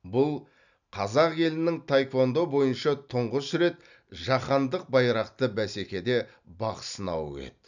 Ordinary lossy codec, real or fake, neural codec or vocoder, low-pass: none; real; none; 7.2 kHz